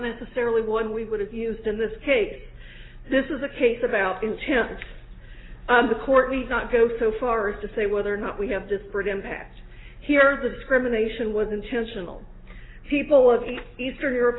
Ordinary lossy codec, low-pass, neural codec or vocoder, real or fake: AAC, 16 kbps; 7.2 kHz; none; real